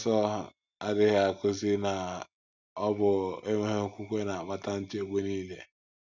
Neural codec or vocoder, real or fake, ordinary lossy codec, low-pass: none; real; none; 7.2 kHz